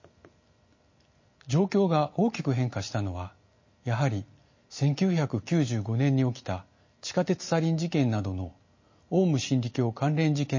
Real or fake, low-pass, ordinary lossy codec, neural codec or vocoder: real; 7.2 kHz; MP3, 32 kbps; none